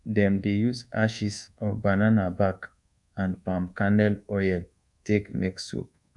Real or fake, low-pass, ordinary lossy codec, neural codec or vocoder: fake; 10.8 kHz; MP3, 96 kbps; codec, 24 kHz, 1.2 kbps, DualCodec